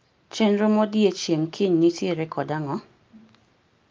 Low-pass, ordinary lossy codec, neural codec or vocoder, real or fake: 7.2 kHz; Opus, 24 kbps; none; real